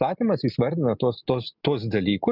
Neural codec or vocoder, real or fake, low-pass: none; real; 5.4 kHz